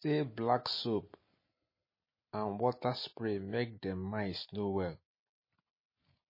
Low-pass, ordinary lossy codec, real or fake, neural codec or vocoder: 5.4 kHz; MP3, 24 kbps; real; none